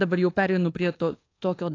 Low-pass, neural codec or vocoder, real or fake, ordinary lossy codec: 7.2 kHz; codec, 24 kHz, 1.2 kbps, DualCodec; fake; AAC, 32 kbps